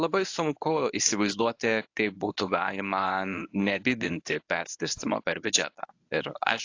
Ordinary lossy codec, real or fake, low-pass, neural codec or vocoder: AAC, 48 kbps; fake; 7.2 kHz; codec, 24 kHz, 0.9 kbps, WavTokenizer, medium speech release version 1